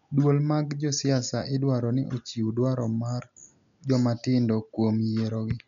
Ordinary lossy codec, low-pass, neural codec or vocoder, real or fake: none; 7.2 kHz; none; real